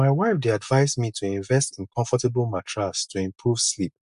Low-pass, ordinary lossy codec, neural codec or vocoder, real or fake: 10.8 kHz; AAC, 96 kbps; none; real